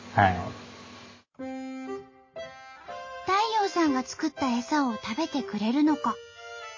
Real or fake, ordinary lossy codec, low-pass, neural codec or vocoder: real; MP3, 32 kbps; 7.2 kHz; none